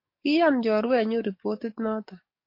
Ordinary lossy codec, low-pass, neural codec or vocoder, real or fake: MP3, 32 kbps; 5.4 kHz; codec, 44.1 kHz, 7.8 kbps, DAC; fake